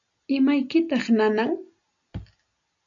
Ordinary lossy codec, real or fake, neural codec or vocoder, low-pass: MP3, 96 kbps; real; none; 7.2 kHz